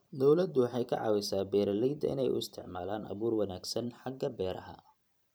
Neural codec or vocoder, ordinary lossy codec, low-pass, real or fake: vocoder, 44.1 kHz, 128 mel bands every 512 samples, BigVGAN v2; none; none; fake